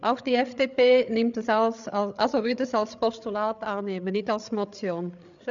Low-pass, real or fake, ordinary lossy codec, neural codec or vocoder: 7.2 kHz; fake; none; codec, 16 kHz, 8 kbps, FreqCodec, larger model